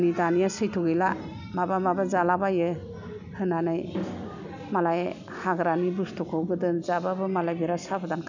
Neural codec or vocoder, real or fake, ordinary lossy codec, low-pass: none; real; none; 7.2 kHz